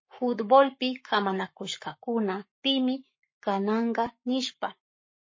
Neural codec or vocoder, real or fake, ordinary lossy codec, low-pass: codec, 44.1 kHz, 7.8 kbps, Pupu-Codec; fake; MP3, 32 kbps; 7.2 kHz